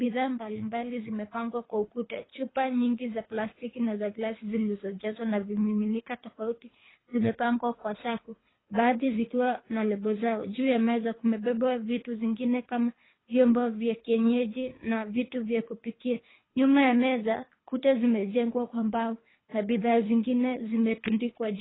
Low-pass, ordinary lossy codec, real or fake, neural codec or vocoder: 7.2 kHz; AAC, 16 kbps; fake; codec, 24 kHz, 3 kbps, HILCodec